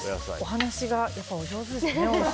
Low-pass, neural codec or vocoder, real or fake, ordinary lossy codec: none; none; real; none